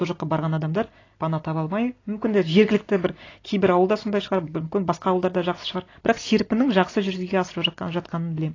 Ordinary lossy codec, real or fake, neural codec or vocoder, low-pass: AAC, 32 kbps; real; none; 7.2 kHz